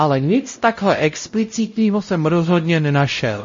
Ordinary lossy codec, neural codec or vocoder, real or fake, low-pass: MP3, 32 kbps; codec, 16 kHz, 0.5 kbps, X-Codec, WavLM features, trained on Multilingual LibriSpeech; fake; 7.2 kHz